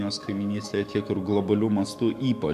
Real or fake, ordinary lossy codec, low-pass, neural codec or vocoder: fake; AAC, 96 kbps; 14.4 kHz; autoencoder, 48 kHz, 128 numbers a frame, DAC-VAE, trained on Japanese speech